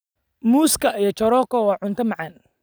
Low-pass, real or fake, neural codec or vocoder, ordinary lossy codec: none; real; none; none